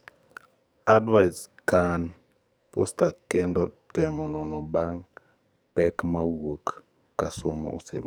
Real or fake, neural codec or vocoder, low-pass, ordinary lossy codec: fake; codec, 44.1 kHz, 2.6 kbps, SNAC; none; none